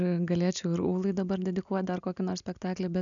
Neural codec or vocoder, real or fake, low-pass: none; real; 7.2 kHz